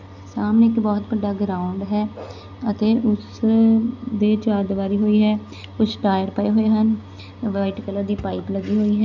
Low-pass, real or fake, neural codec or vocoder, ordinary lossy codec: 7.2 kHz; real; none; none